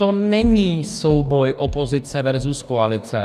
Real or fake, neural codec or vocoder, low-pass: fake; codec, 44.1 kHz, 2.6 kbps, DAC; 14.4 kHz